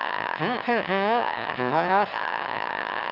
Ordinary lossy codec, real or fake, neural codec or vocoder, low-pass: Opus, 24 kbps; fake; autoencoder, 22.05 kHz, a latent of 192 numbers a frame, VITS, trained on one speaker; 5.4 kHz